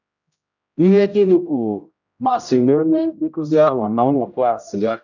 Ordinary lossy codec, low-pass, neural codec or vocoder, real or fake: none; 7.2 kHz; codec, 16 kHz, 0.5 kbps, X-Codec, HuBERT features, trained on general audio; fake